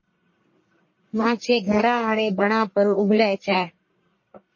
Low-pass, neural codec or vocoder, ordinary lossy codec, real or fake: 7.2 kHz; codec, 44.1 kHz, 1.7 kbps, Pupu-Codec; MP3, 32 kbps; fake